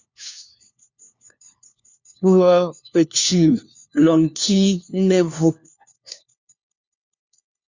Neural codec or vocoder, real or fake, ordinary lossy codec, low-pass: codec, 16 kHz, 1 kbps, FunCodec, trained on LibriTTS, 50 frames a second; fake; Opus, 64 kbps; 7.2 kHz